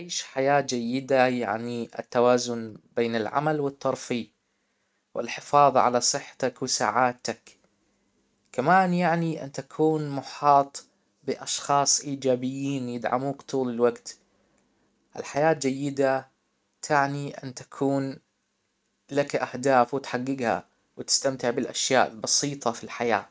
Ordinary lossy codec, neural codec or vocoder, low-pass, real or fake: none; none; none; real